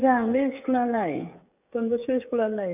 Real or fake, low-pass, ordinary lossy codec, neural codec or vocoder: fake; 3.6 kHz; none; codec, 16 kHz, 16 kbps, FreqCodec, smaller model